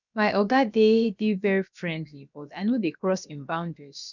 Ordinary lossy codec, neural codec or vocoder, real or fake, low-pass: none; codec, 16 kHz, about 1 kbps, DyCAST, with the encoder's durations; fake; 7.2 kHz